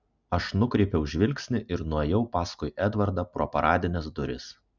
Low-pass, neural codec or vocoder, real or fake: 7.2 kHz; none; real